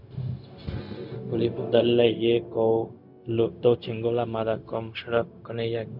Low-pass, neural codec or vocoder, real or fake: 5.4 kHz; codec, 16 kHz, 0.4 kbps, LongCat-Audio-Codec; fake